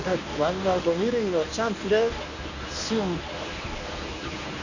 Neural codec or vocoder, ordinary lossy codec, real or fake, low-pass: codec, 24 kHz, 0.9 kbps, WavTokenizer, medium speech release version 1; none; fake; 7.2 kHz